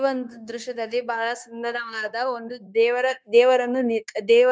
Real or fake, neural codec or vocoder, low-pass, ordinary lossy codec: fake; codec, 16 kHz, 0.9 kbps, LongCat-Audio-Codec; none; none